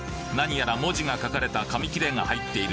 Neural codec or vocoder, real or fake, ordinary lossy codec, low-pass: none; real; none; none